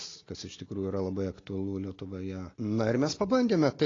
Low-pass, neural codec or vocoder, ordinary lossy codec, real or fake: 7.2 kHz; none; AAC, 32 kbps; real